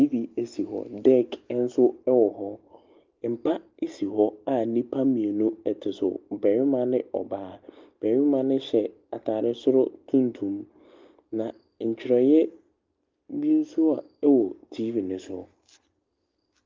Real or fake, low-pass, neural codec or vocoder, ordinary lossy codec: real; 7.2 kHz; none; Opus, 32 kbps